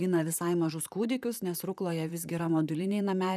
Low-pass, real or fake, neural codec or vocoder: 14.4 kHz; fake; vocoder, 44.1 kHz, 128 mel bands, Pupu-Vocoder